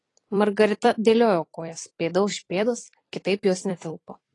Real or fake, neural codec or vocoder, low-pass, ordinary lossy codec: fake; vocoder, 44.1 kHz, 128 mel bands every 256 samples, BigVGAN v2; 10.8 kHz; AAC, 32 kbps